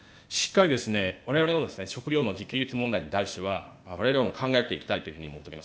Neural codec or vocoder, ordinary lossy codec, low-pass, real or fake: codec, 16 kHz, 0.8 kbps, ZipCodec; none; none; fake